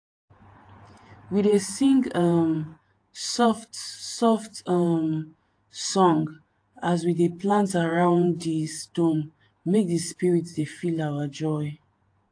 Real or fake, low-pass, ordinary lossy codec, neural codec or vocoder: fake; 9.9 kHz; AAC, 48 kbps; vocoder, 48 kHz, 128 mel bands, Vocos